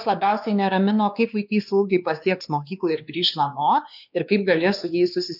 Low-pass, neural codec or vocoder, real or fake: 5.4 kHz; codec, 16 kHz, 2 kbps, X-Codec, WavLM features, trained on Multilingual LibriSpeech; fake